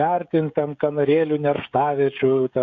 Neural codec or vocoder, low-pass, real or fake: codec, 16 kHz, 16 kbps, FreqCodec, smaller model; 7.2 kHz; fake